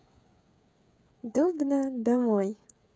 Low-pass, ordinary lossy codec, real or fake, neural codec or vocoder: none; none; fake; codec, 16 kHz, 16 kbps, FreqCodec, smaller model